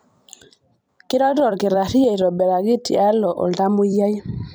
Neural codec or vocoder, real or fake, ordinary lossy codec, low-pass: vocoder, 44.1 kHz, 128 mel bands every 256 samples, BigVGAN v2; fake; none; none